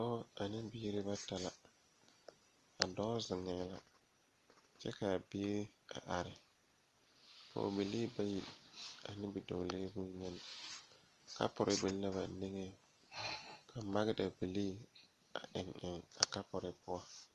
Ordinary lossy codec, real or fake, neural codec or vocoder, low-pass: Opus, 24 kbps; real; none; 14.4 kHz